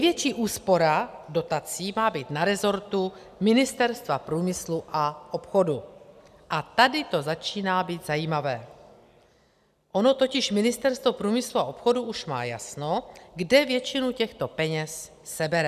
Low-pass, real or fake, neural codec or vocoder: 14.4 kHz; real; none